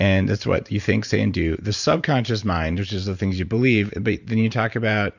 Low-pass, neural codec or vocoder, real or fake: 7.2 kHz; vocoder, 44.1 kHz, 128 mel bands every 512 samples, BigVGAN v2; fake